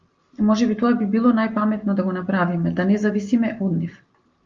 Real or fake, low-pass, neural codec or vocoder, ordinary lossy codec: real; 7.2 kHz; none; Opus, 32 kbps